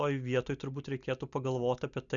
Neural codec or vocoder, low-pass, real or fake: none; 7.2 kHz; real